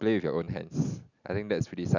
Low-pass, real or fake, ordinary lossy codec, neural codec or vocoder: 7.2 kHz; real; none; none